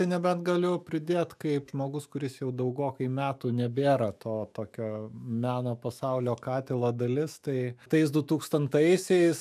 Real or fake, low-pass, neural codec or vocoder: fake; 14.4 kHz; autoencoder, 48 kHz, 128 numbers a frame, DAC-VAE, trained on Japanese speech